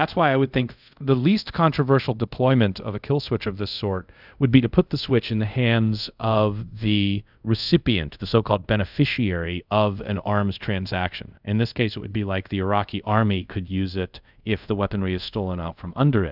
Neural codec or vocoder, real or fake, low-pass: codec, 24 kHz, 0.5 kbps, DualCodec; fake; 5.4 kHz